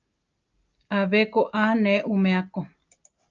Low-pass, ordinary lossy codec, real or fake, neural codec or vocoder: 7.2 kHz; Opus, 24 kbps; real; none